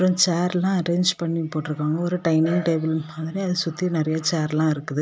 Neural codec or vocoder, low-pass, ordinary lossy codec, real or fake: none; none; none; real